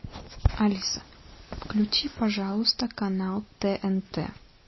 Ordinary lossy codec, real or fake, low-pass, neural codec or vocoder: MP3, 24 kbps; real; 7.2 kHz; none